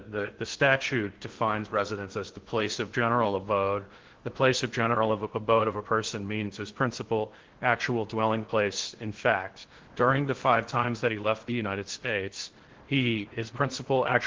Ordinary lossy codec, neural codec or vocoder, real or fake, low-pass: Opus, 16 kbps; codec, 16 kHz in and 24 kHz out, 0.8 kbps, FocalCodec, streaming, 65536 codes; fake; 7.2 kHz